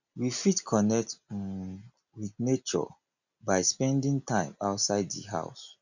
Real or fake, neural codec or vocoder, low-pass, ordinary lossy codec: real; none; 7.2 kHz; none